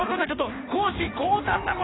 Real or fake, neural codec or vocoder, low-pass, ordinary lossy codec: fake; codec, 16 kHz in and 24 kHz out, 1.1 kbps, FireRedTTS-2 codec; 7.2 kHz; AAC, 16 kbps